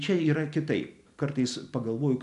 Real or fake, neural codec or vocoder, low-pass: real; none; 10.8 kHz